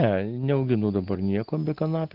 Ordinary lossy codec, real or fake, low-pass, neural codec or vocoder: Opus, 16 kbps; real; 5.4 kHz; none